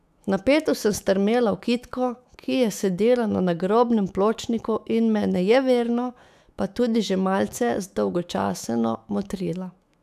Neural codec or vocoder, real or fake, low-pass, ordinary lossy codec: autoencoder, 48 kHz, 128 numbers a frame, DAC-VAE, trained on Japanese speech; fake; 14.4 kHz; none